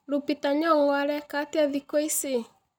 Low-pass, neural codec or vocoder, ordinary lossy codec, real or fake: 19.8 kHz; none; none; real